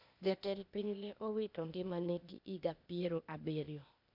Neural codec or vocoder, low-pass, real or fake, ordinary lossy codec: codec, 16 kHz, 0.8 kbps, ZipCodec; 5.4 kHz; fake; none